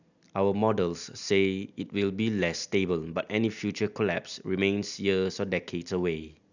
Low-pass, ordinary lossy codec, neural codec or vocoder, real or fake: 7.2 kHz; none; none; real